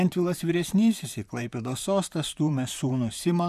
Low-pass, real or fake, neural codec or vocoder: 14.4 kHz; fake; vocoder, 44.1 kHz, 128 mel bands, Pupu-Vocoder